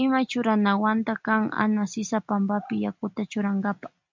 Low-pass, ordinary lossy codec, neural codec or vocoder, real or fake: 7.2 kHz; MP3, 64 kbps; none; real